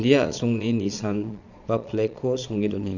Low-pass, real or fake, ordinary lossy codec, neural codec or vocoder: 7.2 kHz; fake; none; vocoder, 22.05 kHz, 80 mel bands, Vocos